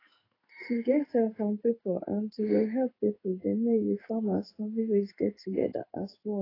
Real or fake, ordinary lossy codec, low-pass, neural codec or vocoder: fake; AAC, 24 kbps; 5.4 kHz; codec, 16 kHz in and 24 kHz out, 1 kbps, XY-Tokenizer